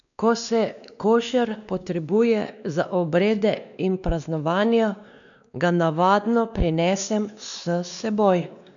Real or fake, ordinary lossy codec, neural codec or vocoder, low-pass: fake; none; codec, 16 kHz, 2 kbps, X-Codec, WavLM features, trained on Multilingual LibriSpeech; 7.2 kHz